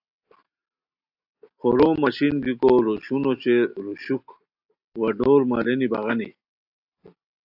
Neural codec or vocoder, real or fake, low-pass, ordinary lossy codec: none; real; 5.4 kHz; AAC, 48 kbps